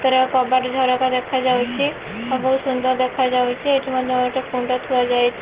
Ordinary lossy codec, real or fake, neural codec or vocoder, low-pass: Opus, 16 kbps; real; none; 3.6 kHz